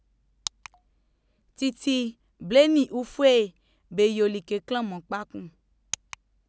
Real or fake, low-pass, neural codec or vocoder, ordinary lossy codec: real; none; none; none